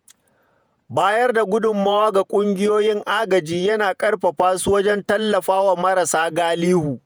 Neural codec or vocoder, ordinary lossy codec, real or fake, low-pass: vocoder, 48 kHz, 128 mel bands, Vocos; none; fake; none